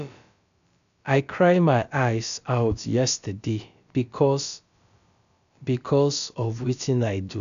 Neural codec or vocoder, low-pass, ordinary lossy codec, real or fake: codec, 16 kHz, about 1 kbps, DyCAST, with the encoder's durations; 7.2 kHz; none; fake